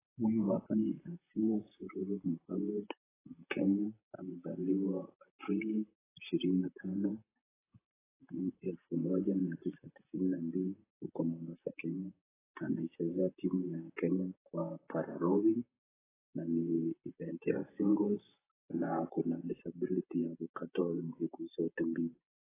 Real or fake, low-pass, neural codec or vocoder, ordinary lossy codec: fake; 3.6 kHz; vocoder, 44.1 kHz, 128 mel bands, Pupu-Vocoder; AAC, 16 kbps